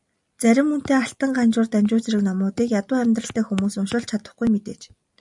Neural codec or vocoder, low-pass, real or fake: none; 10.8 kHz; real